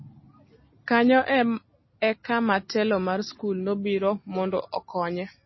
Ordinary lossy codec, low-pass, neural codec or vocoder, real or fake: MP3, 24 kbps; 7.2 kHz; none; real